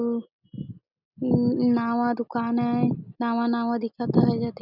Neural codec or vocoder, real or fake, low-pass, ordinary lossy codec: none; real; 5.4 kHz; none